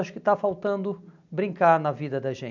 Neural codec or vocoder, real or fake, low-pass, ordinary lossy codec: none; real; 7.2 kHz; none